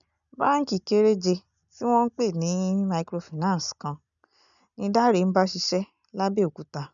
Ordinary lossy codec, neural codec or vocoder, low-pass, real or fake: none; none; 7.2 kHz; real